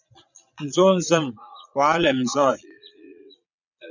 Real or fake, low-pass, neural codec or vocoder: fake; 7.2 kHz; vocoder, 22.05 kHz, 80 mel bands, Vocos